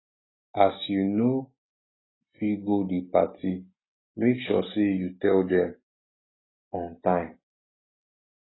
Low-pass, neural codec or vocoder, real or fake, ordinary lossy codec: 7.2 kHz; none; real; AAC, 16 kbps